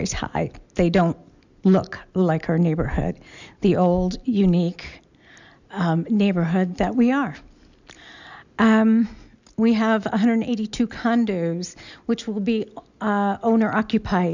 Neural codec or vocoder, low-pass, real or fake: none; 7.2 kHz; real